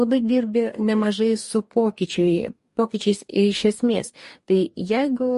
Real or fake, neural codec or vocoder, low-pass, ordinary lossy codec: fake; codec, 44.1 kHz, 2.6 kbps, DAC; 14.4 kHz; MP3, 48 kbps